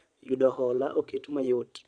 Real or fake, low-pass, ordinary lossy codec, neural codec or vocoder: fake; 9.9 kHz; none; vocoder, 22.05 kHz, 80 mel bands, Vocos